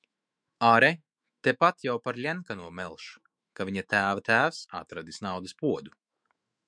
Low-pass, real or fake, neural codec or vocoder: 9.9 kHz; fake; autoencoder, 48 kHz, 128 numbers a frame, DAC-VAE, trained on Japanese speech